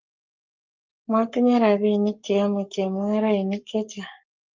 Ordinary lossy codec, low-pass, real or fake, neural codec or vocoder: Opus, 24 kbps; 7.2 kHz; fake; codec, 44.1 kHz, 7.8 kbps, Pupu-Codec